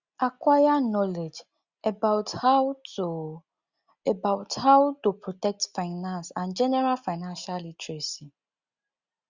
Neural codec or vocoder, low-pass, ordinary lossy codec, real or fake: none; 7.2 kHz; Opus, 64 kbps; real